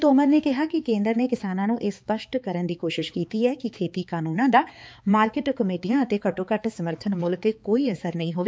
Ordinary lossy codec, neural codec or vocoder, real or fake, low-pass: none; codec, 16 kHz, 4 kbps, X-Codec, HuBERT features, trained on balanced general audio; fake; none